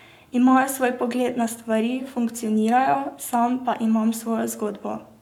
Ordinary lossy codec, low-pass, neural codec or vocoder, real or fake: none; 19.8 kHz; codec, 44.1 kHz, 7.8 kbps, Pupu-Codec; fake